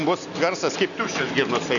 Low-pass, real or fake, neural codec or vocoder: 7.2 kHz; real; none